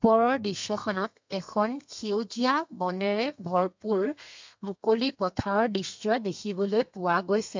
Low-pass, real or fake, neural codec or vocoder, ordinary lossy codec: 7.2 kHz; fake; codec, 32 kHz, 1.9 kbps, SNAC; MP3, 64 kbps